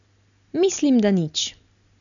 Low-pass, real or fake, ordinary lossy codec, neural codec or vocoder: 7.2 kHz; real; none; none